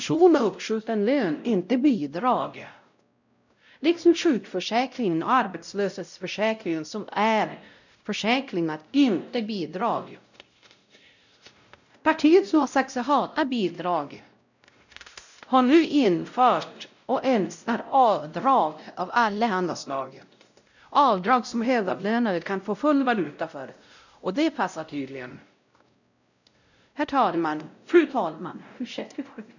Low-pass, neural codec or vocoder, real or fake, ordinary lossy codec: 7.2 kHz; codec, 16 kHz, 0.5 kbps, X-Codec, WavLM features, trained on Multilingual LibriSpeech; fake; none